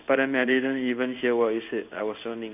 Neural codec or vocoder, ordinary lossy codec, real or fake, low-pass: codec, 16 kHz in and 24 kHz out, 1 kbps, XY-Tokenizer; none; fake; 3.6 kHz